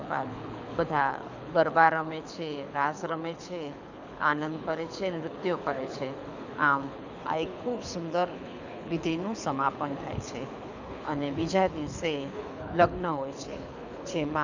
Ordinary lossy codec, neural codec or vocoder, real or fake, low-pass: none; codec, 24 kHz, 6 kbps, HILCodec; fake; 7.2 kHz